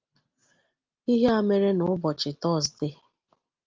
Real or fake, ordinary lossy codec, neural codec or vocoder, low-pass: real; Opus, 32 kbps; none; 7.2 kHz